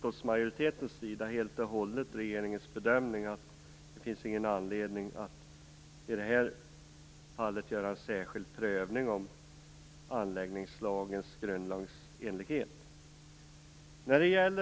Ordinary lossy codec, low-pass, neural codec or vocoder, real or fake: none; none; none; real